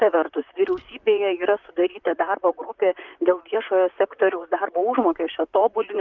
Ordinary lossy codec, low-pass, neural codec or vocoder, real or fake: Opus, 32 kbps; 7.2 kHz; vocoder, 44.1 kHz, 128 mel bands, Pupu-Vocoder; fake